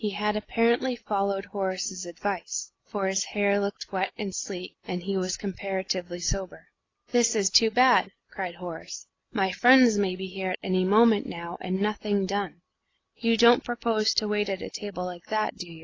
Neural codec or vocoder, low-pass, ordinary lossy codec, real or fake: none; 7.2 kHz; AAC, 32 kbps; real